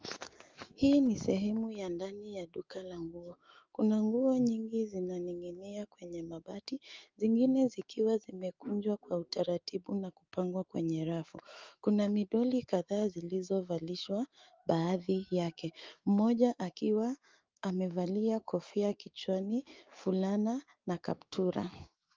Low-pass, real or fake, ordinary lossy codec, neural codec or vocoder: 7.2 kHz; fake; Opus, 32 kbps; autoencoder, 48 kHz, 128 numbers a frame, DAC-VAE, trained on Japanese speech